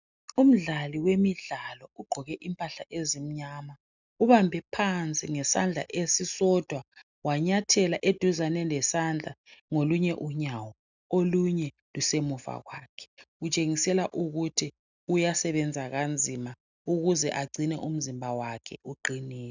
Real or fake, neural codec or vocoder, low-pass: real; none; 7.2 kHz